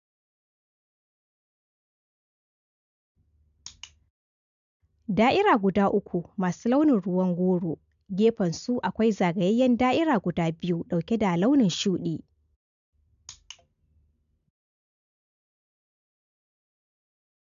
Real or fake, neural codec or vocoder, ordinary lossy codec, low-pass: real; none; MP3, 96 kbps; 7.2 kHz